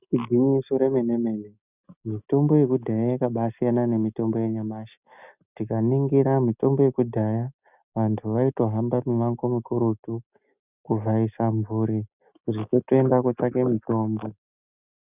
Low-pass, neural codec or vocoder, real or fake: 3.6 kHz; none; real